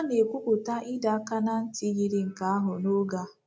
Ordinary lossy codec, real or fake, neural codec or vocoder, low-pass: none; real; none; none